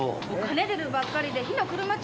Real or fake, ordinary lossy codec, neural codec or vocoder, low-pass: real; none; none; none